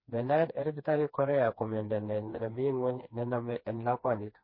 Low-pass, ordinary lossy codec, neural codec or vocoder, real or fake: 5.4 kHz; MP3, 24 kbps; codec, 16 kHz, 2 kbps, FreqCodec, smaller model; fake